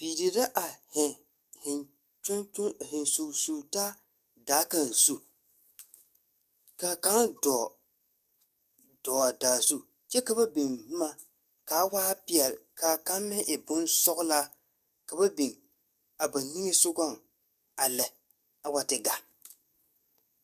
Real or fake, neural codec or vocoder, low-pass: fake; codec, 44.1 kHz, 7.8 kbps, DAC; 14.4 kHz